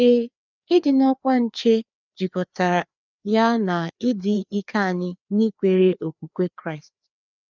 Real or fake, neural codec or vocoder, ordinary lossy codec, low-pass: fake; codec, 16 kHz, 2 kbps, FreqCodec, larger model; none; 7.2 kHz